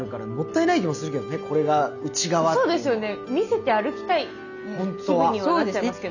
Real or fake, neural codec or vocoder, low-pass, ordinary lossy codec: real; none; 7.2 kHz; none